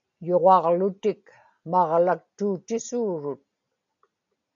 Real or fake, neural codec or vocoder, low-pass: real; none; 7.2 kHz